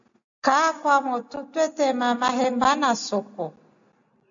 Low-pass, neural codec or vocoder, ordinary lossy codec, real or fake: 7.2 kHz; none; AAC, 64 kbps; real